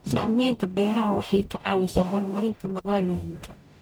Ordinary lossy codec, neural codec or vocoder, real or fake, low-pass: none; codec, 44.1 kHz, 0.9 kbps, DAC; fake; none